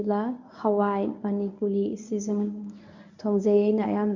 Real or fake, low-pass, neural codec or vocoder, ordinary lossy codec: fake; 7.2 kHz; codec, 24 kHz, 0.9 kbps, WavTokenizer, medium speech release version 1; none